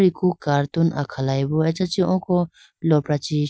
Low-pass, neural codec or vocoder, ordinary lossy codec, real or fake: none; none; none; real